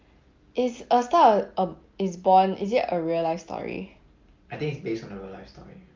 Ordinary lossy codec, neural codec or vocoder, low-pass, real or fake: Opus, 24 kbps; none; 7.2 kHz; real